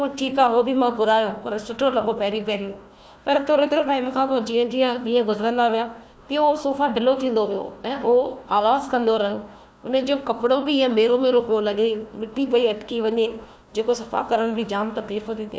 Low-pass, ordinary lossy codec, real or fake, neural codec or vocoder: none; none; fake; codec, 16 kHz, 1 kbps, FunCodec, trained on Chinese and English, 50 frames a second